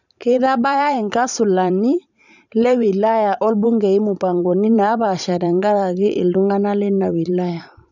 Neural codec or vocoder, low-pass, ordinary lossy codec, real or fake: vocoder, 44.1 kHz, 128 mel bands every 512 samples, BigVGAN v2; 7.2 kHz; none; fake